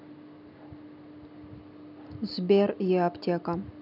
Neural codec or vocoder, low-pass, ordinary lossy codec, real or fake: none; 5.4 kHz; none; real